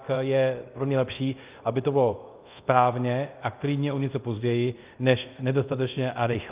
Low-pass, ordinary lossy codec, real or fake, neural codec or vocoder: 3.6 kHz; Opus, 24 kbps; fake; codec, 24 kHz, 0.5 kbps, DualCodec